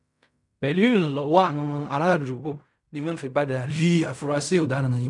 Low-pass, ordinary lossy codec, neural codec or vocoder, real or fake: 10.8 kHz; none; codec, 16 kHz in and 24 kHz out, 0.4 kbps, LongCat-Audio-Codec, fine tuned four codebook decoder; fake